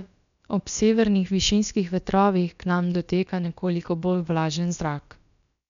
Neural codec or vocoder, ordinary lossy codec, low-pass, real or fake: codec, 16 kHz, about 1 kbps, DyCAST, with the encoder's durations; none; 7.2 kHz; fake